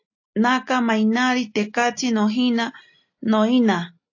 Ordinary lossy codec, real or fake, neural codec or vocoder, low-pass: AAC, 48 kbps; real; none; 7.2 kHz